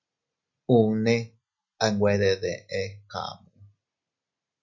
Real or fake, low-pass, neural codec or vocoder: real; 7.2 kHz; none